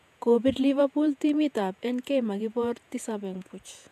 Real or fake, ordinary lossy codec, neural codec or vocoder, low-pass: fake; MP3, 96 kbps; vocoder, 48 kHz, 128 mel bands, Vocos; 14.4 kHz